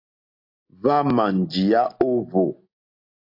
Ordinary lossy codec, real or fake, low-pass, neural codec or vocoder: AAC, 32 kbps; real; 5.4 kHz; none